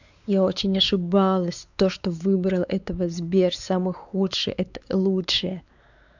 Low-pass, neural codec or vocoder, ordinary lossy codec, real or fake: 7.2 kHz; codec, 16 kHz, 4 kbps, X-Codec, WavLM features, trained on Multilingual LibriSpeech; none; fake